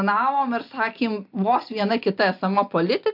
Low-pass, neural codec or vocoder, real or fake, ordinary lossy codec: 5.4 kHz; none; real; MP3, 48 kbps